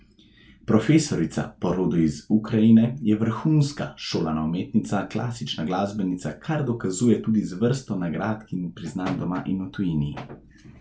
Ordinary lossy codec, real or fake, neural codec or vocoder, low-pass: none; real; none; none